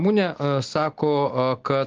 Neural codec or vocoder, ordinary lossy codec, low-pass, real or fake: none; Opus, 16 kbps; 7.2 kHz; real